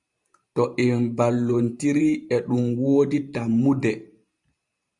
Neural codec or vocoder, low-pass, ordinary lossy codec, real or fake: vocoder, 44.1 kHz, 128 mel bands every 512 samples, BigVGAN v2; 10.8 kHz; Opus, 64 kbps; fake